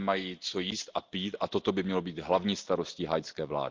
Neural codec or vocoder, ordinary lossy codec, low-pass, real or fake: none; Opus, 32 kbps; 7.2 kHz; real